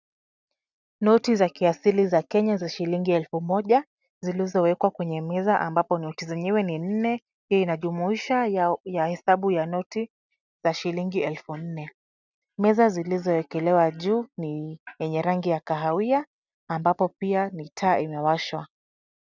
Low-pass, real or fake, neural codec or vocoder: 7.2 kHz; real; none